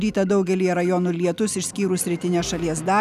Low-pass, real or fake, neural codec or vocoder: 14.4 kHz; real; none